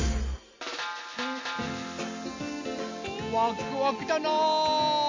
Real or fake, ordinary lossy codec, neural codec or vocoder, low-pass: real; MP3, 64 kbps; none; 7.2 kHz